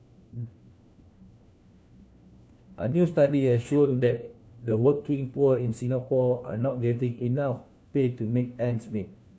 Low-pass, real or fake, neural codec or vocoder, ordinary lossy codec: none; fake; codec, 16 kHz, 1 kbps, FunCodec, trained on LibriTTS, 50 frames a second; none